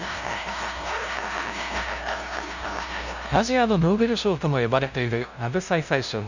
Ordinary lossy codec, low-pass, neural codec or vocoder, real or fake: none; 7.2 kHz; codec, 16 kHz, 0.5 kbps, FunCodec, trained on LibriTTS, 25 frames a second; fake